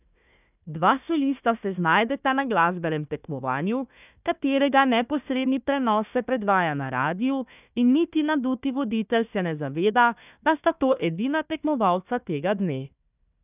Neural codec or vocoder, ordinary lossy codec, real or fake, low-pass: codec, 16 kHz, 1 kbps, FunCodec, trained on Chinese and English, 50 frames a second; none; fake; 3.6 kHz